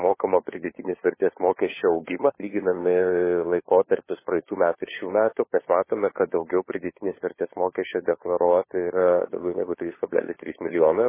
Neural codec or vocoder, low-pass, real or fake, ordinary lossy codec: codec, 16 kHz, 4 kbps, FunCodec, trained on LibriTTS, 50 frames a second; 3.6 kHz; fake; MP3, 16 kbps